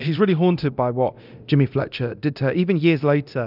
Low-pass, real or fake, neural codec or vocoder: 5.4 kHz; fake; codec, 24 kHz, 0.9 kbps, DualCodec